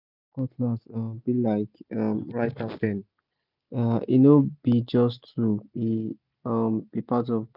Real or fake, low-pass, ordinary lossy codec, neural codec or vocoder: real; 5.4 kHz; none; none